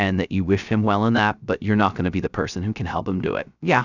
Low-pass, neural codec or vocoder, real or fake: 7.2 kHz; codec, 16 kHz, 0.3 kbps, FocalCodec; fake